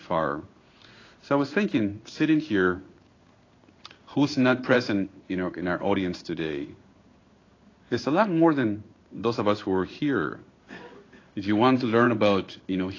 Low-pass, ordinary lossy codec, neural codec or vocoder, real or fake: 7.2 kHz; AAC, 32 kbps; codec, 16 kHz in and 24 kHz out, 1 kbps, XY-Tokenizer; fake